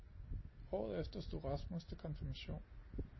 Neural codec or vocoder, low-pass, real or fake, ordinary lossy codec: none; 7.2 kHz; real; MP3, 24 kbps